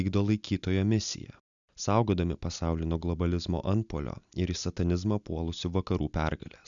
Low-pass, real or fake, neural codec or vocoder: 7.2 kHz; real; none